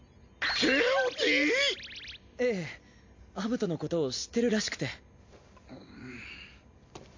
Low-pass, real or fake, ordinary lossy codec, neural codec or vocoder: 7.2 kHz; real; MP3, 48 kbps; none